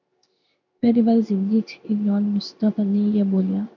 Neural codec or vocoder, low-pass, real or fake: codec, 16 kHz in and 24 kHz out, 1 kbps, XY-Tokenizer; 7.2 kHz; fake